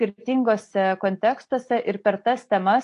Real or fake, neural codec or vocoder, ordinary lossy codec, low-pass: real; none; AAC, 48 kbps; 10.8 kHz